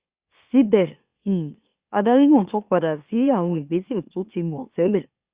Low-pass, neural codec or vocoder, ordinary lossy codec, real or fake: 3.6 kHz; autoencoder, 44.1 kHz, a latent of 192 numbers a frame, MeloTTS; Opus, 64 kbps; fake